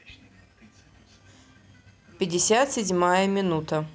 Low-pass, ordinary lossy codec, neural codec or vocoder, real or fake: none; none; none; real